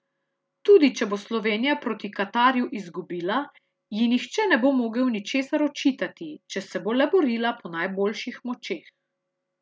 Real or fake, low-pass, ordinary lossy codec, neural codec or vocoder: real; none; none; none